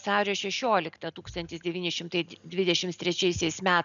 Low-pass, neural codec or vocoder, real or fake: 7.2 kHz; none; real